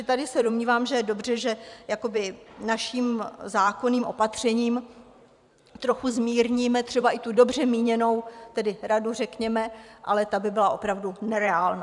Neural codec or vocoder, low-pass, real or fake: vocoder, 44.1 kHz, 128 mel bands every 512 samples, BigVGAN v2; 10.8 kHz; fake